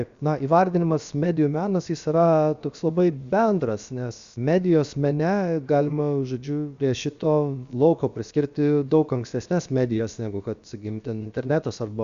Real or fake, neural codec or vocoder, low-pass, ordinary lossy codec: fake; codec, 16 kHz, about 1 kbps, DyCAST, with the encoder's durations; 7.2 kHz; Opus, 64 kbps